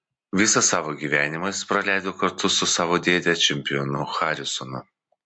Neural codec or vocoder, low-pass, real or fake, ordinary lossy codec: none; 9.9 kHz; real; MP3, 48 kbps